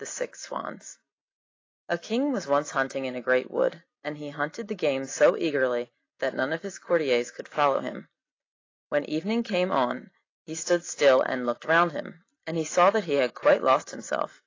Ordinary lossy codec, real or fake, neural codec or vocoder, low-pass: AAC, 32 kbps; real; none; 7.2 kHz